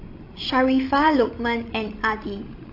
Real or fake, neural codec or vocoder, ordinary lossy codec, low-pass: fake; codec, 16 kHz, 16 kbps, FreqCodec, larger model; AAC, 32 kbps; 5.4 kHz